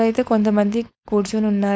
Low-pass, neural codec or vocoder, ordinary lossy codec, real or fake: none; codec, 16 kHz, 4.8 kbps, FACodec; none; fake